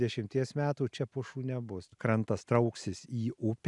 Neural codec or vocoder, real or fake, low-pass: none; real; 10.8 kHz